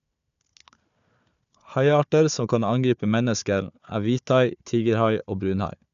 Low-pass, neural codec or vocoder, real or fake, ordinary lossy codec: 7.2 kHz; codec, 16 kHz, 4 kbps, FunCodec, trained on Chinese and English, 50 frames a second; fake; none